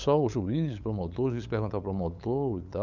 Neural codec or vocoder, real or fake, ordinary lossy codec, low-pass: codec, 16 kHz, 8 kbps, FunCodec, trained on LibriTTS, 25 frames a second; fake; none; 7.2 kHz